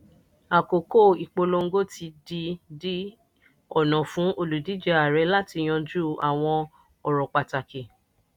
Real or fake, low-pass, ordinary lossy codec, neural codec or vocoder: real; 19.8 kHz; none; none